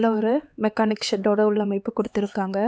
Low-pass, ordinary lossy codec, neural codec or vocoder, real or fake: none; none; codec, 16 kHz, 4 kbps, X-Codec, HuBERT features, trained on LibriSpeech; fake